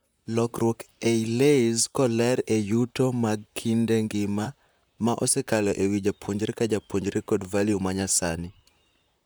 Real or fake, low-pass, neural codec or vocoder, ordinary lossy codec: fake; none; vocoder, 44.1 kHz, 128 mel bands, Pupu-Vocoder; none